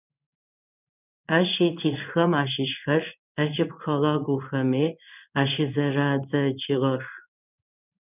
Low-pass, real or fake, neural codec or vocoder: 3.6 kHz; fake; codec, 16 kHz in and 24 kHz out, 1 kbps, XY-Tokenizer